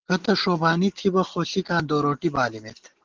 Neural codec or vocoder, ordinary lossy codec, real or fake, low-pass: none; Opus, 16 kbps; real; 7.2 kHz